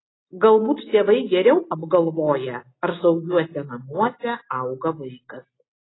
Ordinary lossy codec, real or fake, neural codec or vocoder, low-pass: AAC, 16 kbps; real; none; 7.2 kHz